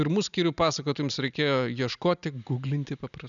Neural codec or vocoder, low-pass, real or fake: none; 7.2 kHz; real